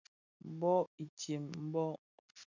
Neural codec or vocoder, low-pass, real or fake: none; 7.2 kHz; real